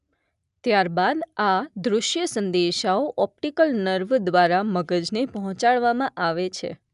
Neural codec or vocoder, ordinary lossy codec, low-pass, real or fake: none; none; 10.8 kHz; real